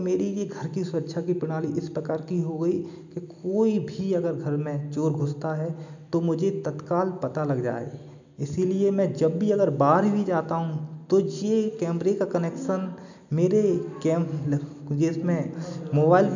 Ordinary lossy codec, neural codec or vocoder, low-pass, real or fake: none; none; 7.2 kHz; real